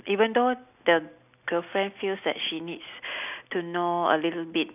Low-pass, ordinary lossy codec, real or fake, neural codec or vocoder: 3.6 kHz; none; real; none